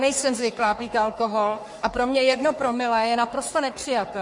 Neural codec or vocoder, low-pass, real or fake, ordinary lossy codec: codec, 44.1 kHz, 3.4 kbps, Pupu-Codec; 10.8 kHz; fake; MP3, 48 kbps